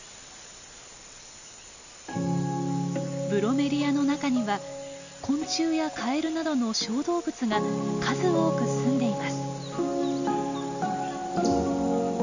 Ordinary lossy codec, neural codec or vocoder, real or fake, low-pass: none; none; real; 7.2 kHz